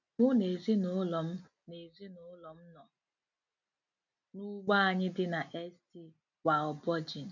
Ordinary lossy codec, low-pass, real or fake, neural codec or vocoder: none; 7.2 kHz; real; none